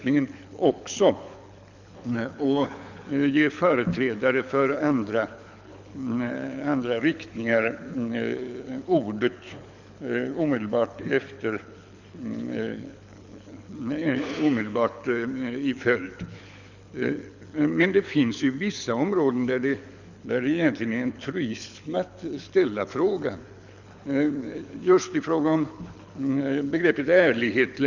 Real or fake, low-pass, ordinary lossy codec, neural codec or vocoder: fake; 7.2 kHz; none; codec, 24 kHz, 6 kbps, HILCodec